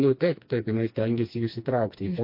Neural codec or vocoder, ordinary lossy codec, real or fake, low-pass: codec, 16 kHz, 2 kbps, FreqCodec, smaller model; MP3, 32 kbps; fake; 5.4 kHz